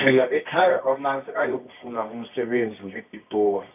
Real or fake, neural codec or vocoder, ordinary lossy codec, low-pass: fake; codec, 24 kHz, 0.9 kbps, WavTokenizer, medium music audio release; none; 3.6 kHz